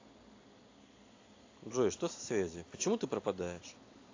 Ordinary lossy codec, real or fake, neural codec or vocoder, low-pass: AAC, 32 kbps; real; none; 7.2 kHz